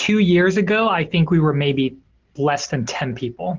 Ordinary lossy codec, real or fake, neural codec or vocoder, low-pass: Opus, 16 kbps; real; none; 7.2 kHz